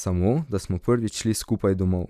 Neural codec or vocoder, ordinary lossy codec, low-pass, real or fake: none; none; 14.4 kHz; real